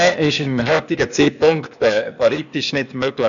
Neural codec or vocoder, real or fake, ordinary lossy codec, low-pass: codec, 16 kHz, 0.8 kbps, ZipCodec; fake; MP3, 96 kbps; 7.2 kHz